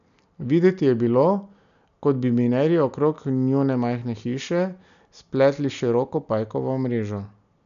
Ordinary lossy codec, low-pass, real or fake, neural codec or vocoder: none; 7.2 kHz; real; none